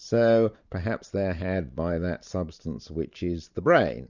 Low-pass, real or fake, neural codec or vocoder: 7.2 kHz; fake; vocoder, 44.1 kHz, 128 mel bands every 512 samples, BigVGAN v2